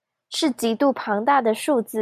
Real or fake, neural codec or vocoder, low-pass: real; none; 14.4 kHz